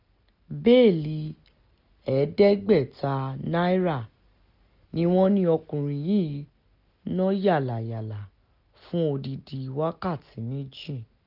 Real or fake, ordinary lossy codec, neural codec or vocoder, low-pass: real; none; none; 5.4 kHz